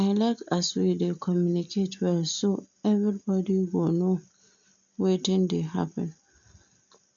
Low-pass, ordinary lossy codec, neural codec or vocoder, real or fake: 7.2 kHz; none; none; real